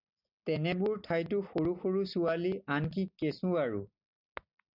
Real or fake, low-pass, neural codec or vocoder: real; 5.4 kHz; none